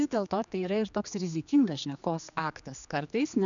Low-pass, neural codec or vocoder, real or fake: 7.2 kHz; codec, 16 kHz, 2 kbps, X-Codec, HuBERT features, trained on general audio; fake